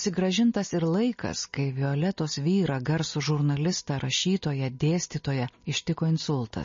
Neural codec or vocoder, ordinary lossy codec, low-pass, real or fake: none; MP3, 32 kbps; 7.2 kHz; real